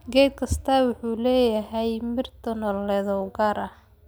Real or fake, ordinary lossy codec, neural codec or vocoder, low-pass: real; none; none; none